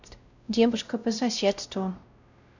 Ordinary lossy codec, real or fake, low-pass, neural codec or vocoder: none; fake; 7.2 kHz; codec, 16 kHz, 0.5 kbps, X-Codec, WavLM features, trained on Multilingual LibriSpeech